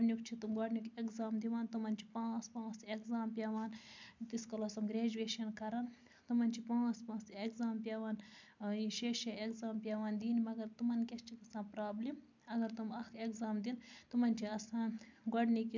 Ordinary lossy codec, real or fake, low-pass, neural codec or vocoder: MP3, 64 kbps; real; 7.2 kHz; none